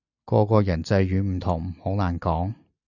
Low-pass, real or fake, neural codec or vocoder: 7.2 kHz; real; none